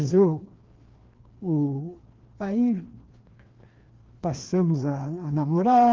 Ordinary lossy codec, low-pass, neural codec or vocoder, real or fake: Opus, 16 kbps; 7.2 kHz; codec, 16 kHz, 2 kbps, FreqCodec, larger model; fake